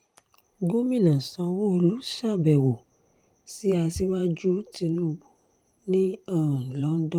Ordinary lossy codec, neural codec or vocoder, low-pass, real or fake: Opus, 32 kbps; vocoder, 44.1 kHz, 128 mel bands every 512 samples, BigVGAN v2; 19.8 kHz; fake